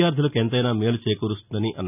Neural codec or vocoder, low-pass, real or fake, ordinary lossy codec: none; 3.6 kHz; real; none